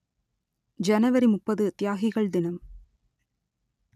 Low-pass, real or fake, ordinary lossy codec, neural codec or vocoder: 14.4 kHz; real; none; none